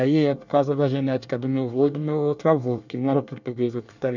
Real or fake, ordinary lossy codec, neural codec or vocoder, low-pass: fake; none; codec, 24 kHz, 1 kbps, SNAC; 7.2 kHz